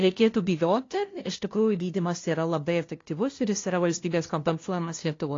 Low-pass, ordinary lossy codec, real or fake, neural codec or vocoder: 7.2 kHz; AAC, 32 kbps; fake; codec, 16 kHz, 0.5 kbps, FunCodec, trained on LibriTTS, 25 frames a second